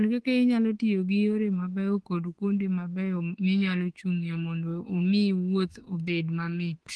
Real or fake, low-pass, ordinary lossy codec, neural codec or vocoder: fake; 10.8 kHz; Opus, 16 kbps; autoencoder, 48 kHz, 32 numbers a frame, DAC-VAE, trained on Japanese speech